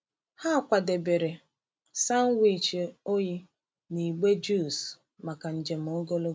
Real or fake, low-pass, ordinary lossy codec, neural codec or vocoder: real; none; none; none